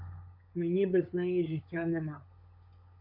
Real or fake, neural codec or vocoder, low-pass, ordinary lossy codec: fake; codec, 16 kHz, 16 kbps, FunCodec, trained on LibriTTS, 50 frames a second; 5.4 kHz; AAC, 32 kbps